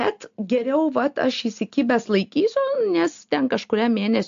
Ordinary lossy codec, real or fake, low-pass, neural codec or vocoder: AAC, 64 kbps; real; 7.2 kHz; none